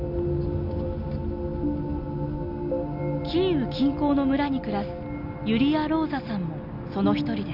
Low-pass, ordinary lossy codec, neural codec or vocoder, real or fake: 5.4 kHz; none; none; real